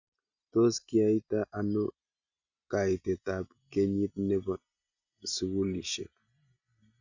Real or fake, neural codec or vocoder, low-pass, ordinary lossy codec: real; none; 7.2 kHz; Opus, 64 kbps